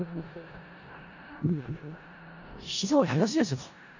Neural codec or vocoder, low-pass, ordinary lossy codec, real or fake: codec, 16 kHz in and 24 kHz out, 0.4 kbps, LongCat-Audio-Codec, four codebook decoder; 7.2 kHz; none; fake